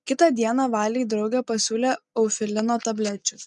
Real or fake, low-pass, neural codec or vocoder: real; 10.8 kHz; none